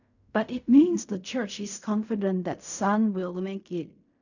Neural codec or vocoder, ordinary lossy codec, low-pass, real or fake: codec, 16 kHz in and 24 kHz out, 0.4 kbps, LongCat-Audio-Codec, fine tuned four codebook decoder; none; 7.2 kHz; fake